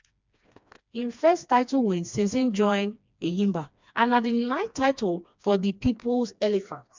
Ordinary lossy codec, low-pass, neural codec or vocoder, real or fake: MP3, 64 kbps; 7.2 kHz; codec, 16 kHz, 2 kbps, FreqCodec, smaller model; fake